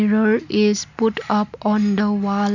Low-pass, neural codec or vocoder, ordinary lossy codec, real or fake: 7.2 kHz; none; none; real